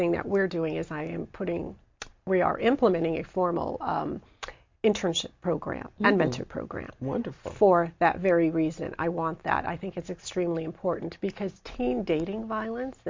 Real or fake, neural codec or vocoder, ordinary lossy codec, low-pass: real; none; MP3, 48 kbps; 7.2 kHz